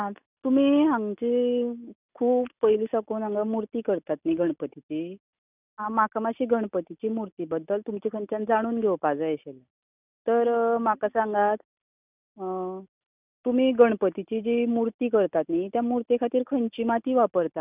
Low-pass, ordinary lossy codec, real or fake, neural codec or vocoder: 3.6 kHz; none; real; none